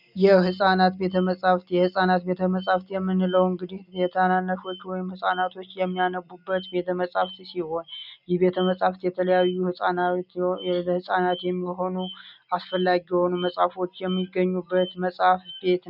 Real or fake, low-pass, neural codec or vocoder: real; 5.4 kHz; none